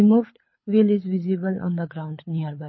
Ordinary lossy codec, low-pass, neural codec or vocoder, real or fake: MP3, 24 kbps; 7.2 kHz; codec, 24 kHz, 6 kbps, HILCodec; fake